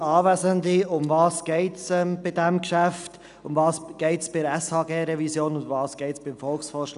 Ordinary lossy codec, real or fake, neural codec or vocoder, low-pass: none; real; none; 10.8 kHz